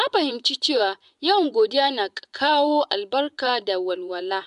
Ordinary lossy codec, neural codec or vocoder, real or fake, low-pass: MP3, 96 kbps; vocoder, 24 kHz, 100 mel bands, Vocos; fake; 10.8 kHz